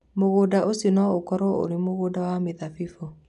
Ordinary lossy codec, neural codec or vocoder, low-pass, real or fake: none; none; 10.8 kHz; real